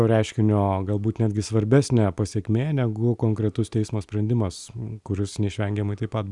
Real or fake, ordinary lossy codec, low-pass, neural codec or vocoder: real; Opus, 64 kbps; 10.8 kHz; none